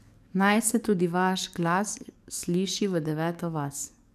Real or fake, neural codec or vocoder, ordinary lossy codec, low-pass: fake; codec, 44.1 kHz, 7.8 kbps, DAC; none; 14.4 kHz